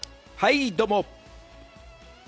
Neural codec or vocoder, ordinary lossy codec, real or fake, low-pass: none; none; real; none